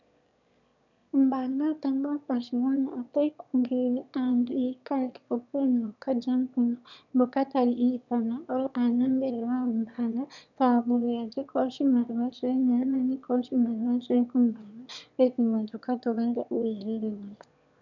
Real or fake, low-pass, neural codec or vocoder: fake; 7.2 kHz; autoencoder, 22.05 kHz, a latent of 192 numbers a frame, VITS, trained on one speaker